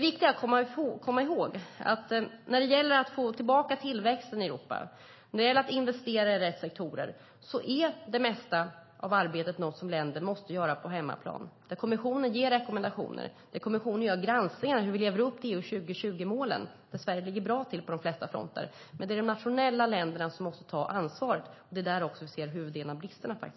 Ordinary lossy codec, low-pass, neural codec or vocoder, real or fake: MP3, 24 kbps; 7.2 kHz; none; real